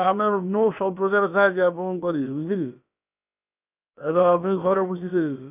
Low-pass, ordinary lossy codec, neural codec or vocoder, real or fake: 3.6 kHz; none; codec, 16 kHz, about 1 kbps, DyCAST, with the encoder's durations; fake